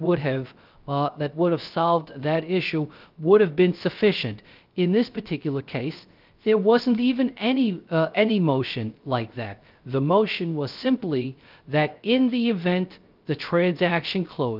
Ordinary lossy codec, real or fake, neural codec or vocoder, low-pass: Opus, 24 kbps; fake; codec, 16 kHz, 0.3 kbps, FocalCodec; 5.4 kHz